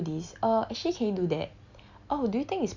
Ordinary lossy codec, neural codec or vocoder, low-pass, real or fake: Opus, 64 kbps; none; 7.2 kHz; real